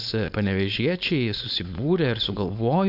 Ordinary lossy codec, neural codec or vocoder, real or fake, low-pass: AAC, 48 kbps; codec, 16 kHz, 4.8 kbps, FACodec; fake; 5.4 kHz